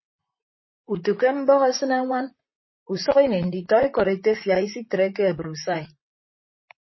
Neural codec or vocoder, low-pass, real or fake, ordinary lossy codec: vocoder, 44.1 kHz, 128 mel bands, Pupu-Vocoder; 7.2 kHz; fake; MP3, 24 kbps